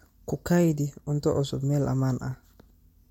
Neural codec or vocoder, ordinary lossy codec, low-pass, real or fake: none; MP3, 64 kbps; 19.8 kHz; real